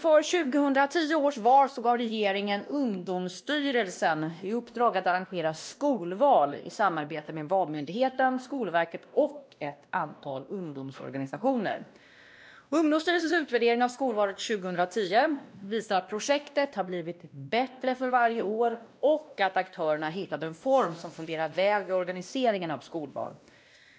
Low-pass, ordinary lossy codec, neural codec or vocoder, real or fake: none; none; codec, 16 kHz, 1 kbps, X-Codec, WavLM features, trained on Multilingual LibriSpeech; fake